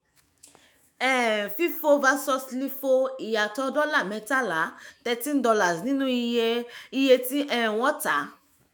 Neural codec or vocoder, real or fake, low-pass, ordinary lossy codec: autoencoder, 48 kHz, 128 numbers a frame, DAC-VAE, trained on Japanese speech; fake; none; none